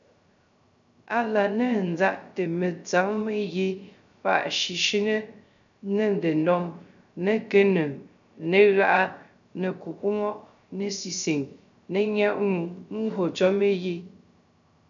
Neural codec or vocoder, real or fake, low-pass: codec, 16 kHz, 0.3 kbps, FocalCodec; fake; 7.2 kHz